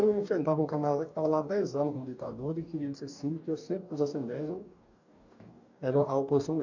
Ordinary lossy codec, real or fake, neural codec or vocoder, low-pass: none; fake; codec, 44.1 kHz, 2.6 kbps, DAC; 7.2 kHz